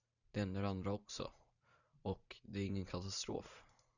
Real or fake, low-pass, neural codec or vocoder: fake; 7.2 kHz; vocoder, 44.1 kHz, 128 mel bands every 512 samples, BigVGAN v2